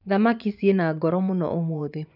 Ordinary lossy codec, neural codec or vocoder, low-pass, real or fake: none; vocoder, 24 kHz, 100 mel bands, Vocos; 5.4 kHz; fake